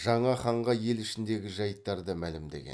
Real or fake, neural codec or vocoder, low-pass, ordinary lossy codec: real; none; none; none